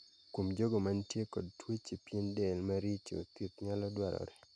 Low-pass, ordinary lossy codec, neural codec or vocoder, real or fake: 9.9 kHz; MP3, 96 kbps; none; real